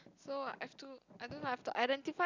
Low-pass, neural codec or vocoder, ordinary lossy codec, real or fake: 7.2 kHz; none; none; real